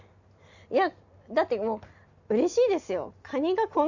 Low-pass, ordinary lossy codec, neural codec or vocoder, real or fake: 7.2 kHz; none; none; real